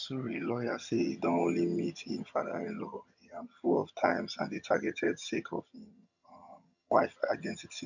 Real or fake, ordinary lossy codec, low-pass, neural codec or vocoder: fake; none; 7.2 kHz; vocoder, 22.05 kHz, 80 mel bands, HiFi-GAN